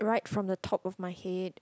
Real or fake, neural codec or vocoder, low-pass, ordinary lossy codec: real; none; none; none